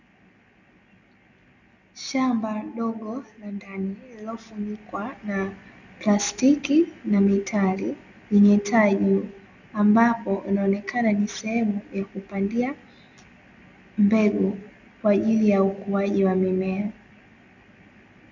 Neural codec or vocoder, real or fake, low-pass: none; real; 7.2 kHz